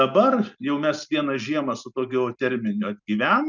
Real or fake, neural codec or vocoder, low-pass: real; none; 7.2 kHz